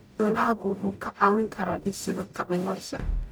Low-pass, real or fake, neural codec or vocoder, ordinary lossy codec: none; fake; codec, 44.1 kHz, 0.9 kbps, DAC; none